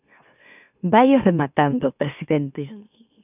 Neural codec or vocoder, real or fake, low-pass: autoencoder, 44.1 kHz, a latent of 192 numbers a frame, MeloTTS; fake; 3.6 kHz